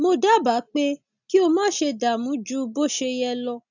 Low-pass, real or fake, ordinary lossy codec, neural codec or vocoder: 7.2 kHz; real; none; none